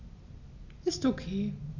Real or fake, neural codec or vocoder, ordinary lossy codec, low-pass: real; none; none; 7.2 kHz